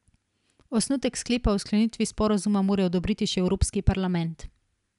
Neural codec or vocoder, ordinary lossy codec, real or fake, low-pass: none; none; real; 10.8 kHz